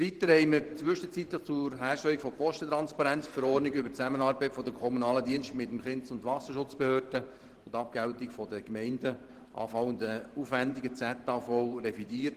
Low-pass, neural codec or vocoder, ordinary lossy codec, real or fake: 14.4 kHz; vocoder, 44.1 kHz, 128 mel bands every 512 samples, BigVGAN v2; Opus, 16 kbps; fake